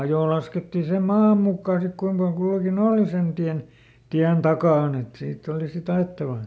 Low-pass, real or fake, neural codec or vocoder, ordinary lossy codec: none; real; none; none